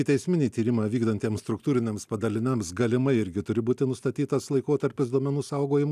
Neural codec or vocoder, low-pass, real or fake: none; 14.4 kHz; real